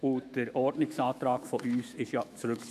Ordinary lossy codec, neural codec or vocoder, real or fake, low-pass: none; autoencoder, 48 kHz, 128 numbers a frame, DAC-VAE, trained on Japanese speech; fake; 14.4 kHz